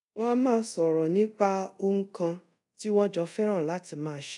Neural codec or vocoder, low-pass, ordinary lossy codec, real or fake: codec, 24 kHz, 0.5 kbps, DualCodec; 10.8 kHz; none; fake